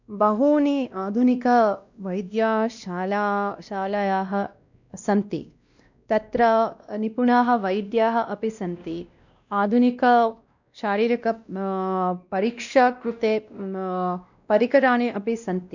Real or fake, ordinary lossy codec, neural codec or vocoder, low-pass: fake; none; codec, 16 kHz, 1 kbps, X-Codec, WavLM features, trained on Multilingual LibriSpeech; 7.2 kHz